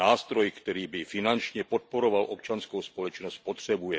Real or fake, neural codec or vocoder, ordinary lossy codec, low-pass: real; none; none; none